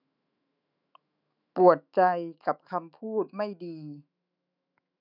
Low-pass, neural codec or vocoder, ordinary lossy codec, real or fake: 5.4 kHz; autoencoder, 48 kHz, 128 numbers a frame, DAC-VAE, trained on Japanese speech; none; fake